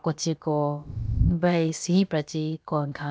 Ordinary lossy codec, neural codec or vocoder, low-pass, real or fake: none; codec, 16 kHz, about 1 kbps, DyCAST, with the encoder's durations; none; fake